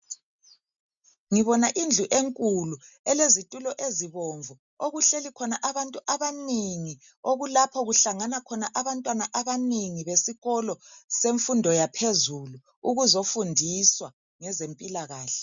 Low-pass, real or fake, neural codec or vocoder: 7.2 kHz; real; none